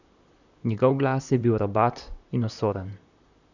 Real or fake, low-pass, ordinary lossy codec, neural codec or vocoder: fake; 7.2 kHz; none; vocoder, 44.1 kHz, 128 mel bands, Pupu-Vocoder